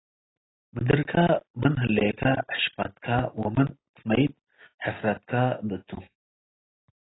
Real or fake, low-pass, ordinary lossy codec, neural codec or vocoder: real; 7.2 kHz; AAC, 16 kbps; none